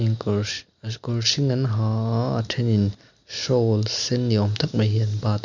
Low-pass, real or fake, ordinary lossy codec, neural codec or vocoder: 7.2 kHz; real; none; none